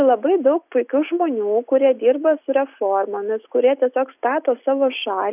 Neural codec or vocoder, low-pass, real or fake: none; 3.6 kHz; real